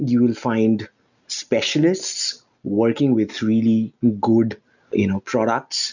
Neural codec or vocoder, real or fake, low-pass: none; real; 7.2 kHz